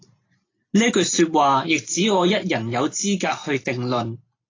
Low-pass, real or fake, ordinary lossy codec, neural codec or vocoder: 7.2 kHz; real; AAC, 32 kbps; none